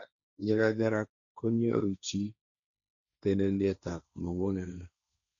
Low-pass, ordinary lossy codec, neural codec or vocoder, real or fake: 7.2 kHz; none; codec, 16 kHz, 1.1 kbps, Voila-Tokenizer; fake